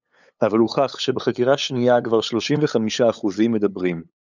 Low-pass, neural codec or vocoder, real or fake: 7.2 kHz; codec, 16 kHz, 8 kbps, FunCodec, trained on LibriTTS, 25 frames a second; fake